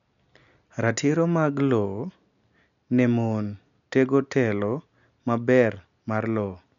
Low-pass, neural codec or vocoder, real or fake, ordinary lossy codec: 7.2 kHz; none; real; none